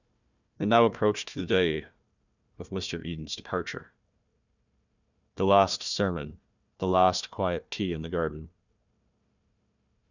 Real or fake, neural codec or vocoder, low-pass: fake; codec, 16 kHz, 1 kbps, FunCodec, trained on Chinese and English, 50 frames a second; 7.2 kHz